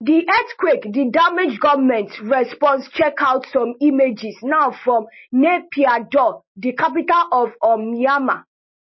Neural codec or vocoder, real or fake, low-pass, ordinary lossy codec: none; real; 7.2 kHz; MP3, 24 kbps